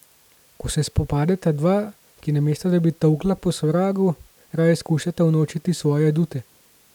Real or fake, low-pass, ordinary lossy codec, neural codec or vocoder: real; 19.8 kHz; none; none